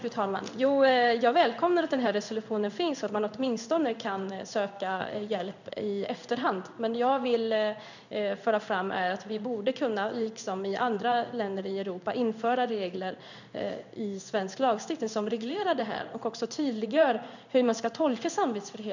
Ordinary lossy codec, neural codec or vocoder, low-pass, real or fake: none; codec, 16 kHz in and 24 kHz out, 1 kbps, XY-Tokenizer; 7.2 kHz; fake